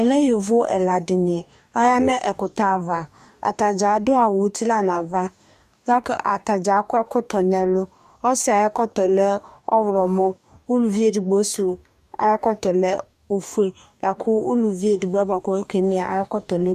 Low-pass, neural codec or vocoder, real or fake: 14.4 kHz; codec, 44.1 kHz, 2.6 kbps, DAC; fake